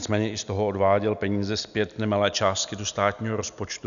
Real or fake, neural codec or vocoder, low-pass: real; none; 7.2 kHz